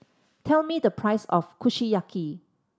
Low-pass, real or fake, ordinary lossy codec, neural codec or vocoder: none; real; none; none